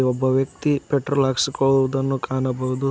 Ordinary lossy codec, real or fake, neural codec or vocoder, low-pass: none; real; none; none